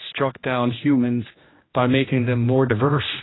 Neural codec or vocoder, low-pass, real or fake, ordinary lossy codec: codec, 16 kHz, 1 kbps, X-Codec, HuBERT features, trained on general audio; 7.2 kHz; fake; AAC, 16 kbps